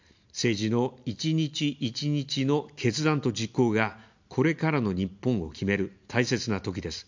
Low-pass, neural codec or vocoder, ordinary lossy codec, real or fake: 7.2 kHz; none; MP3, 64 kbps; real